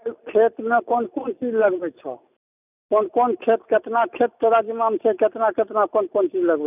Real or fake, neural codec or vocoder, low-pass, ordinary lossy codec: real; none; 3.6 kHz; none